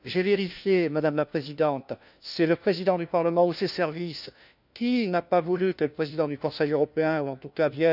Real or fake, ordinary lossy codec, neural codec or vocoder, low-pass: fake; none; codec, 16 kHz, 1 kbps, FunCodec, trained on LibriTTS, 50 frames a second; 5.4 kHz